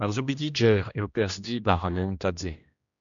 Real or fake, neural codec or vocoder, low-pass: fake; codec, 16 kHz, 1 kbps, X-Codec, HuBERT features, trained on general audio; 7.2 kHz